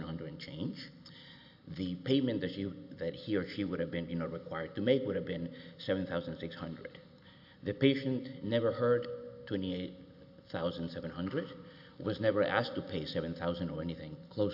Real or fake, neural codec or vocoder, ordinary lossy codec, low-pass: real; none; AAC, 48 kbps; 5.4 kHz